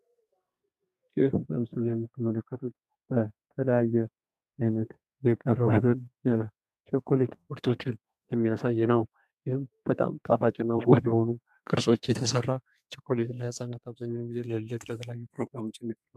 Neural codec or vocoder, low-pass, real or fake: codec, 32 kHz, 1.9 kbps, SNAC; 14.4 kHz; fake